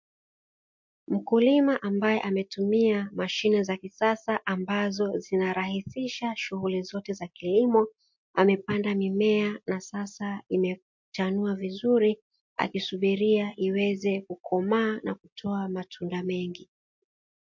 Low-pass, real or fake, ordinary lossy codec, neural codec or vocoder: 7.2 kHz; real; MP3, 48 kbps; none